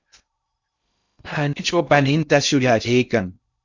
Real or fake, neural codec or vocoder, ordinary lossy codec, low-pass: fake; codec, 16 kHz in and 24 kHz out, 0.6 kbps, FocalCodec, streaming, 2048 codes; Opus, 64 kbps; 7.2 kHz